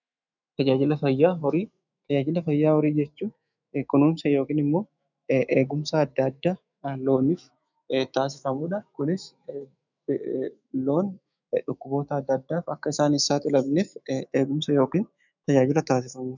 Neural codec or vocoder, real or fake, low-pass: autoencoder, 48 kHz, 128 numbers a frame, DAC-VAE, trained on Japanese speech; fake; 7.2 kHz